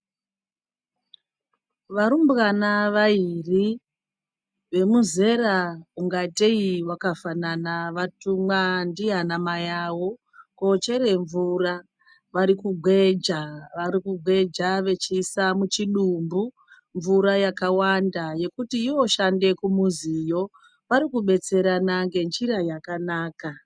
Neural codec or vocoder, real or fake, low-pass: none; real; 9.9 kHz